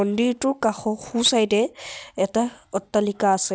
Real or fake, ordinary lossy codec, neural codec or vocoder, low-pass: real; none; none; none